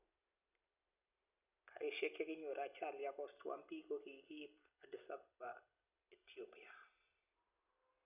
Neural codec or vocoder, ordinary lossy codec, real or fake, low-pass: none; none; real; 3.6 kHz